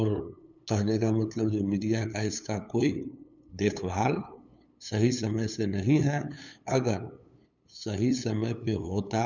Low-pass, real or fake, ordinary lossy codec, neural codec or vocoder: 7.2 kHz; fake; none; codec, 16 kHz, 8 kbps, FunCodec, trained on LibriTTS, 25 frames a second